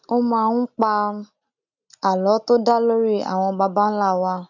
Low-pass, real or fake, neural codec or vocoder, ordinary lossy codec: 7.2 kHz; real; none; none